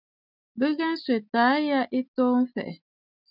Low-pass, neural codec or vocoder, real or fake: 5.4 kHz; none; real